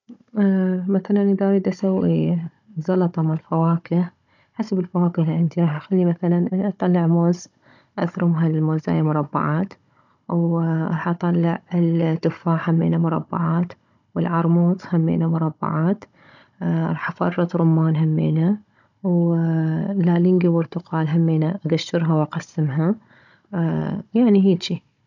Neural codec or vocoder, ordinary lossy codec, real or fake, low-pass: codec, 16 kHz, 16 kbps, FunCodec, trained on Chinese and English, 50 frames a second; none; fake; 7.2 kHz